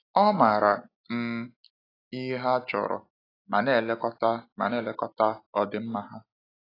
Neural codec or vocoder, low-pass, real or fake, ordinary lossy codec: none; 5.4 kHz; real; AAC, 24 kbps